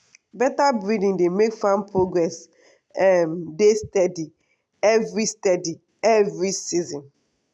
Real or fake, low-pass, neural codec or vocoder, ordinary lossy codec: real; none; none; none